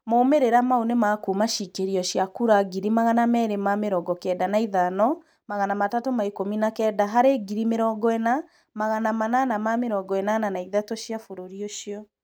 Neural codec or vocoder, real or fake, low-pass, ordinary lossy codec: none; real; none; none